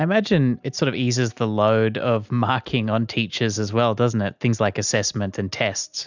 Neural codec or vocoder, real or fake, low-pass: none; real; 7.2 kHz